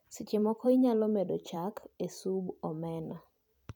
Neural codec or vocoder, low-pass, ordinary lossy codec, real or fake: vocoder, 44.1 kHz, 128 mel bands every 256 samples, BigVGAN v2; 19.8 kHz; none; fake